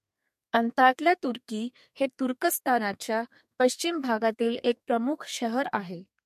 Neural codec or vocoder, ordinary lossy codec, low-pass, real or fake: codec, 32 kHz, 1.9 kbps, SNAC; MP3, 64 kbps; 14.4 kHz; fake